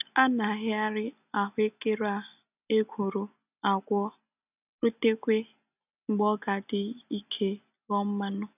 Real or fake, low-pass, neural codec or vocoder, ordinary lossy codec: real; 3.6 kHz; none; none